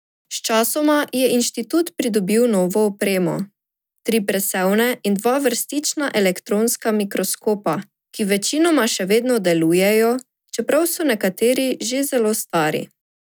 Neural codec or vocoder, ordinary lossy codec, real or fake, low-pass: none; none; real; none